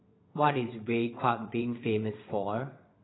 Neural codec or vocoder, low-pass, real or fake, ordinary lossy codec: vocoder, 22.05 kHz, 80 mel bands, WaveNeXt; 7.2 kHz; fake; AAC, 16 kbps